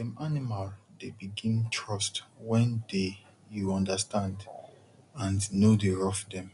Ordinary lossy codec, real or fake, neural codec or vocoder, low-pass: none; real; none; 10.8 kHz